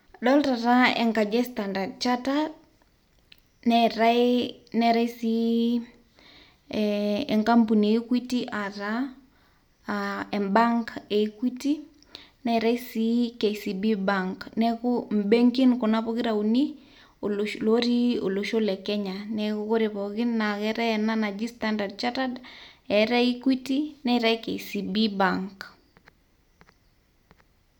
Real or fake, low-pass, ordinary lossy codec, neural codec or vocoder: real; 19.8 kHz; none; none